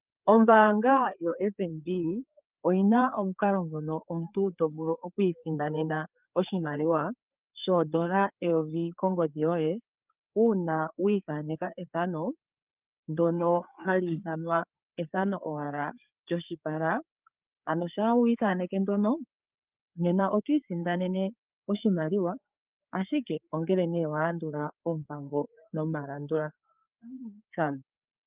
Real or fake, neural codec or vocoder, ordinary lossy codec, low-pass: fake; codec, 16 kHz, 4 kbps, FreqCodec, larger model; Opus, 24 kbps; 3.6 kHz